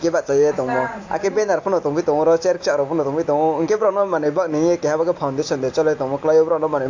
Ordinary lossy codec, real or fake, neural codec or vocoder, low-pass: AAC, 48 kbps; real; none; 7.2 kHz